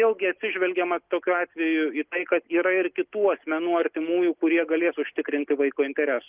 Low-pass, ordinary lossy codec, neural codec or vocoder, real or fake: 3.6 kHz; Opus, 32 kbps; none; real